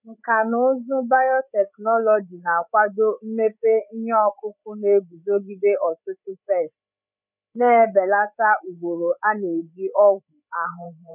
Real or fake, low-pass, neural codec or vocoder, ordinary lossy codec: fake; 3.6 kHz; codec, 16 kHz, 8 kbps, FreqCodec, larger model; none